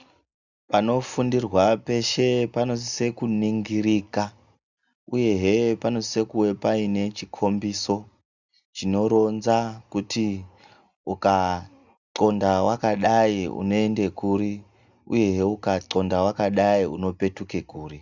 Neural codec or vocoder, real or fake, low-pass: none; real; 7.2 kHz